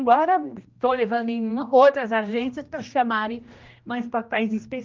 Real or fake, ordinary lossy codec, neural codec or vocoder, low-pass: fake; Opus, 24 kbps; codec, 16 kHz, 1 kbps, X-Codec, HuBERT features, trained on general audio; 7.2 kHz